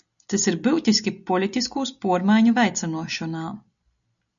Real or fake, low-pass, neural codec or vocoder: real; 7.2 kHz; none